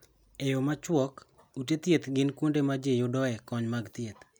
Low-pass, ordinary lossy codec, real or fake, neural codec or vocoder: none; none; real; none